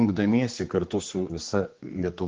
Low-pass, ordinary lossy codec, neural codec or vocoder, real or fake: 7.2 kHz; Opus, 16 kbps; codec, 16 kHz, 4 kbps, X-Codec, HuBERT features, trained on general audio; fake